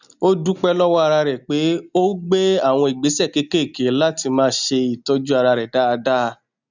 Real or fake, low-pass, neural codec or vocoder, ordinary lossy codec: real; 7.2 kHz; none; none